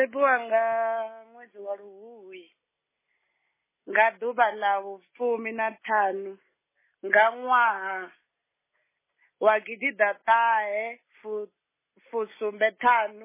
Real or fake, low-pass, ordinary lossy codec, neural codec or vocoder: real; 3.6 kHz; MP3, 16 kbps; none